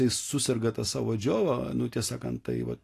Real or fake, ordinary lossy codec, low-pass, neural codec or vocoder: real; AAC, 48 kbps; 14.4 kHz; none